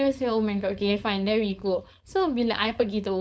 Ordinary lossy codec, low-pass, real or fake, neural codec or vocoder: none; none; fake; codec, 16 kHz, 4.8 kbps, FACodec